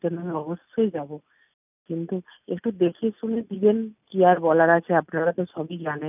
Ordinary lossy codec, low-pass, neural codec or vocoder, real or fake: none; 3.6 kHz; none; real